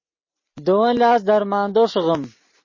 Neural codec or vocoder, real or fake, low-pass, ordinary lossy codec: none; real; 7.2 kHz; MP3, 32 kbps